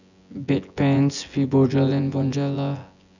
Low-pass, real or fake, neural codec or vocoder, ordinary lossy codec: 7.2 kHz; fake; vocoder, 24 kHz, 100 mel bands, Vocos; none